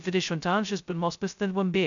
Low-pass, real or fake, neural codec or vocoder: 7.2 kHz; fake; codec, 16 kHz, 0.2 kbps, FocalCodec